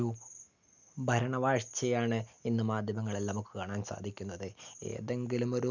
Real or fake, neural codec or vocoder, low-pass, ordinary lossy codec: real; none; 7.2 kHz; none